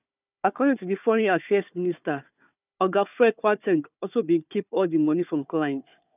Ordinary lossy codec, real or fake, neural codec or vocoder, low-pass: none; fake; codec, 16 kHz, 4 kbps, FunCodec, trained on Chinese and English, 50 frames a second; 3.6 kHz